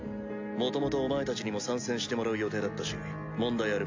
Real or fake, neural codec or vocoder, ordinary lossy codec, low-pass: real; none; AAC, 48 kbps; 7.2 kHz